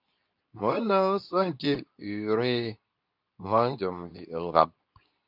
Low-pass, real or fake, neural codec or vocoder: 5.4 kHz; fake; codec, 24 kHz, 0.9 kbps, WavTokenizer, medium speech release version 2